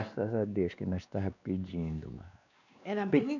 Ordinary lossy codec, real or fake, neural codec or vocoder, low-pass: none; fake; codec, 16 kHz, 2 kbps, X-Codec, WavLM features, trained on Multilingual LibriSpeech; 7.2 kHz